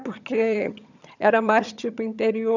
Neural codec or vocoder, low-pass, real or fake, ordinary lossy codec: vocoder, 22.05 kHz, 80 mel bands, HiFi-GAN; 7.2 kHz; fake; none